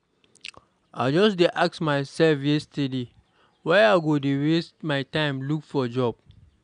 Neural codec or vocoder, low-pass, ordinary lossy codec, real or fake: none; 9.9 kHz; none; real